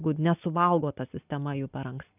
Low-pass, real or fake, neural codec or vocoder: 3.6 kHz; real; none